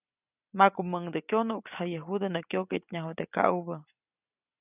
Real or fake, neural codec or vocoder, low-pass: real; none; 3.6 kHz